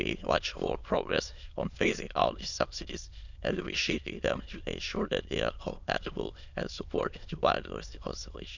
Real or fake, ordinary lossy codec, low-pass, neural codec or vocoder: fake; none; 7.2 kHz; autoencoder, 22.05 kHz, a latent of 192 numbers a frame, VITS, trained on many speakers